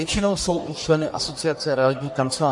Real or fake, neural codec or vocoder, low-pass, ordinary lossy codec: fake; codec, 44.1 kHz, 1.7 kbps, Pupu-Codec; 10.8 kHz; MP3, 48 kbps